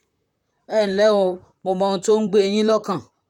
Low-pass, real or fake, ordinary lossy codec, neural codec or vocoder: 19.8 kHz; fake; none; vocoder, 44.1 kHz, 128 mel bands, Pupu-Vocoder